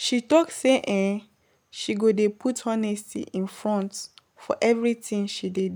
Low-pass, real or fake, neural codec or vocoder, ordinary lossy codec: none; real; none; none